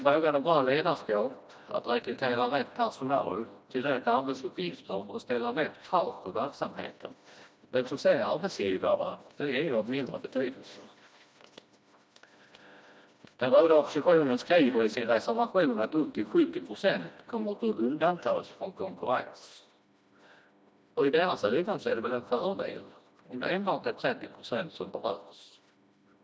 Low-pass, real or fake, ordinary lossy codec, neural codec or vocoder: none; fake; none; codec, 16 kHz, 1 kbps, FreqCodec, smaller model